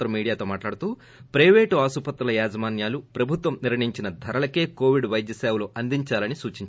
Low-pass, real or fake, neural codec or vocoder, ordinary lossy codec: none; real; none; none